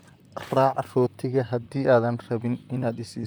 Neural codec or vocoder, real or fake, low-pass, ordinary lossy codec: vocoder, 44.1 kHz, 128 mel bands, Pupu-Vocoder; fake; none; none